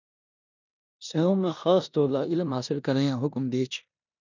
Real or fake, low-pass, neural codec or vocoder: fake; 7.2 kHz; codec, 16 kHz in and 24 kHz out, 0.9 kbps, LongCat-Audio-Codec, four codebook decoder